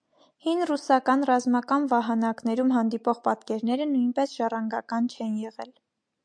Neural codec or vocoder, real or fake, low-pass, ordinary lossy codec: none; real; 9.9 kHz; MP3, 64 kbps